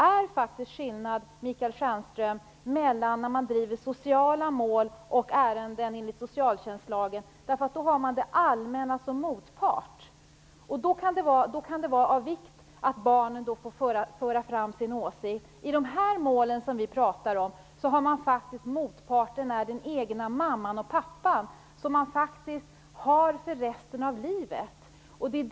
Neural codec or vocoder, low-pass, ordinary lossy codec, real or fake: none; none; none; real